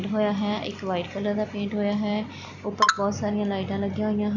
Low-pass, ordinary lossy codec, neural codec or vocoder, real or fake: 7.2 kHz; none; none; real